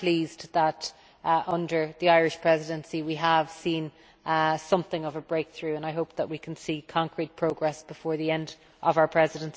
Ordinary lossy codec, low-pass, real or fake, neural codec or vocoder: none; none; real; none